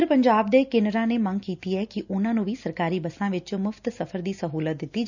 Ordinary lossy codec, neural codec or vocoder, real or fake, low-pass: none; none; real; 7.2 kHz